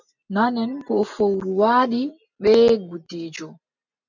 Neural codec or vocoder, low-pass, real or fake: none; 7.2 kHz; real